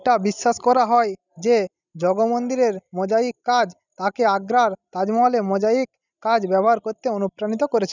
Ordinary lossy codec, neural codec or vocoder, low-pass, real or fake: none; none; 7.2 kHz; real